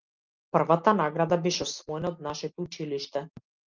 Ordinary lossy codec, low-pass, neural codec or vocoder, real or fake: Opus, 32 kbps; 7.2 kHz; none; real